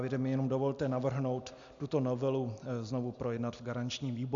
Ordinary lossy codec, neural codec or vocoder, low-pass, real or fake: MP3, 64 kbps; none; 7.2 kHz; real